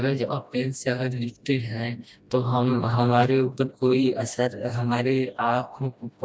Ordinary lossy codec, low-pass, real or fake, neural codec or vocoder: none; none; fake; codec, 16 kHz, 1 kbps, FreqCodec, smaller model